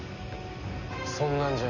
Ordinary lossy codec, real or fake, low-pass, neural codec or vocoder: none; real; 7.2 kHz; none